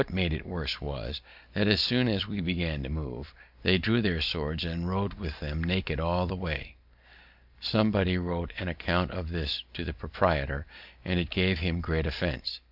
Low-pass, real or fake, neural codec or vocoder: 5.4 kHz; real; none